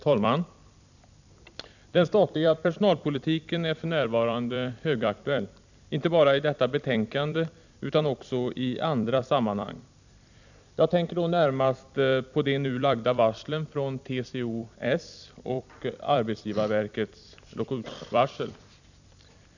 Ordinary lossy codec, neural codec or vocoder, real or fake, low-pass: none; vocoder, 44.1 kHz, 128 mel bands every 256 samples, BigVGAN v2; fake; 7.2 kHz